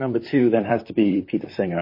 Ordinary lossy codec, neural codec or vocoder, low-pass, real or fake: MP3, 24 kbps; vocoder, 44.1 kHz, 128 mel bands, Pupu-Vocoder; 5.4 kHz; fake